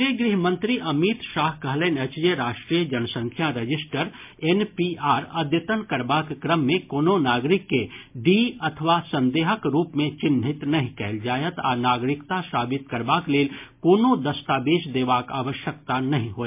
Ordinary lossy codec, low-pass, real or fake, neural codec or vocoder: MP3, 32 kbps; 3.6 kHz; real; none